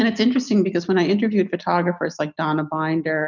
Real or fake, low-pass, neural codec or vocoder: real; 7.2 kHz; none